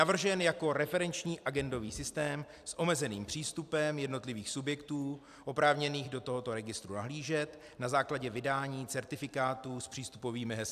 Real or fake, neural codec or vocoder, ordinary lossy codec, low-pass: fake; vocoder, 44.1 kHz, 128 mel bands every 256 samples, BigVGAN v2; AAC, 96 kbps; 14.4 kHz